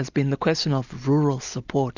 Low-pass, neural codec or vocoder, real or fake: 7.2 kHz; none; real